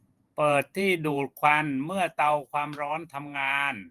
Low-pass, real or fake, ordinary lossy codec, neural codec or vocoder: 14.4 kHz; fake; Opus, 24 kbps; vocoder, 48 kHz, 128 mel bands, Vocos